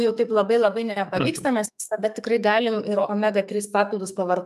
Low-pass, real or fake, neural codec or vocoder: 14.4 kHz; fake; codec, 44.1 kHz, 2.6 kbps, SNAC